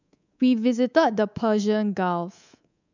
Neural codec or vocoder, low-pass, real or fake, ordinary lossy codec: codec, 24 kHz, 3.1 kbps, DualCodec; 7.2 kHz; fake; none